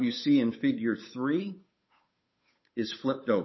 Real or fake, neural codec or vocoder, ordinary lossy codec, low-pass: fake; codec, 16 kHz, 8 kbps, FunCodec, trained on Chinese and English, 25 frames a second; MP3, 24 kbps; 7.2 kHz